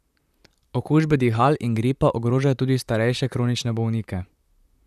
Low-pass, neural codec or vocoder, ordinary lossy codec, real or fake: 14.4 kHz; vocoder, 44.1 kHz, 128 mel bands, Pupu-Vocoder; none; fake